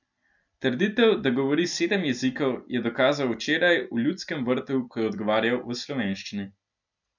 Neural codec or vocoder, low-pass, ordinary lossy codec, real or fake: none; 7.2 kHz; none; real